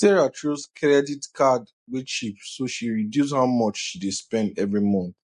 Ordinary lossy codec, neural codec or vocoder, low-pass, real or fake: MP3, 48 kbps; none; 14.4 kHz; real